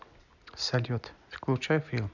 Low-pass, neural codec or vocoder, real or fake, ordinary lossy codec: 7.2 kHz; none; real; none